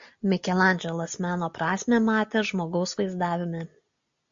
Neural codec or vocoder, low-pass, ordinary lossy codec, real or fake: none; 7.2 kHz; MP3, 48 kbps; real